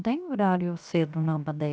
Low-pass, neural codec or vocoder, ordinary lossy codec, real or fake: none; codec, 16 kHz, 0.7 kbps, FocalCodec; none; fake